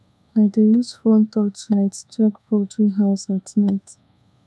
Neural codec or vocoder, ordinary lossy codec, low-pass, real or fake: codec, 24 kHz, 1.2 kbps, DualCodec; none; none; fake